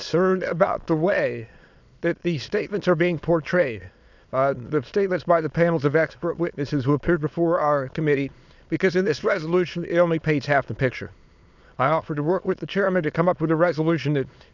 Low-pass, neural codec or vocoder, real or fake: 7.2 kHz; autoencoder, 22.05 kHz, a latent of 192 numbers a frame, VITS, trained on many speakers; fake